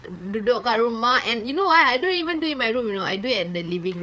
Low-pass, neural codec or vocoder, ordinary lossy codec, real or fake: none; codec, 16 kHz, 4 kbps, FreqCodec, larger model; none; fake